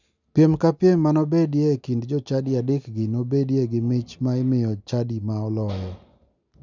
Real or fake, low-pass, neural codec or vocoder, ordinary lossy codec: real; 7.2 kHz; none; none